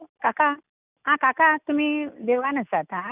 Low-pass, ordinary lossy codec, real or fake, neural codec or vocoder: 3.6 kHz; none; real; none